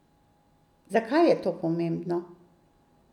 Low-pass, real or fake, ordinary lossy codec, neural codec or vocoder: 19.8 kHz; real; none; none